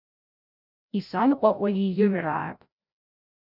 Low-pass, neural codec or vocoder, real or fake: 5.4 kHz; codec, 16 kHz, 0.5 kbps, FreqCodec, larger model; fake